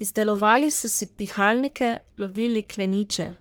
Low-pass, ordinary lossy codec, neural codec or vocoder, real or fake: none; none; codec, 44.1 kHz, 1.7 kbps, Pupu-Codec; fake